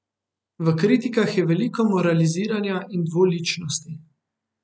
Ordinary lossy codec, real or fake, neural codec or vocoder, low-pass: none; real; none; none